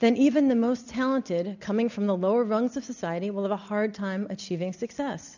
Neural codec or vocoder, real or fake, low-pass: none; real; 7.2 kHz